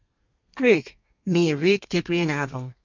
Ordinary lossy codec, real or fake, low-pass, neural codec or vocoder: MP3, 64 kbps; fake; 7.2 kHz; codec, 32 kHz, 1.9 kbps, SNAC